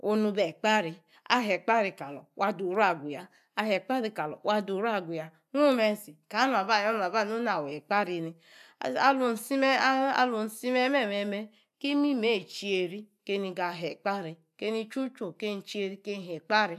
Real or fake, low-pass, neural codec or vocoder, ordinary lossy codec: real; 14.4 kHz; none; MP3, 96 kbps